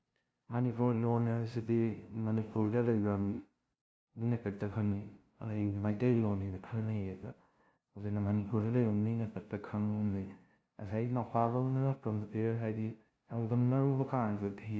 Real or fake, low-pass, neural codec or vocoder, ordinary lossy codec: fake; none; codec, 16 kHz, 0.5 kbps, FunCodec, trained on LibriTTS, 25 frames a second; none